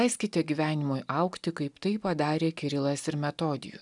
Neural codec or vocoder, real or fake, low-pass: none; real; 10.8 kHz